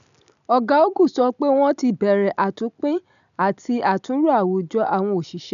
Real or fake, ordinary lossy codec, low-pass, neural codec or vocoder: real; none; 7.2 kHz; none